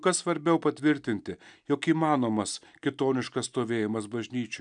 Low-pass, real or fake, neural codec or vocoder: 9.9 kHz; real; none